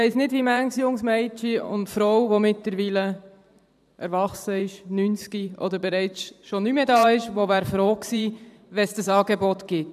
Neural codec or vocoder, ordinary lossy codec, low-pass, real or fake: vocoder, 44.1 kHz, 128 mel bands every 512 samples, BigVGAN v2; none; 14.4 kHz; fake